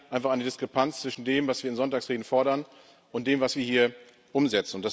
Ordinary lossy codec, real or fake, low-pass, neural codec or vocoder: none; real; none; none